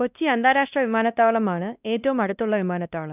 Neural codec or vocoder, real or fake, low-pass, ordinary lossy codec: codec, 16 kHz, 1 kbps, X-Codec, WavLM features, trained on Multilingual LibriSpeech; fake; 3.6 kHz; none